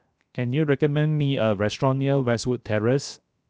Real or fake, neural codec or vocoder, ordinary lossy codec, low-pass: fake; codec, 16 kHz, 0.7 kbps, FocalCodec; none; none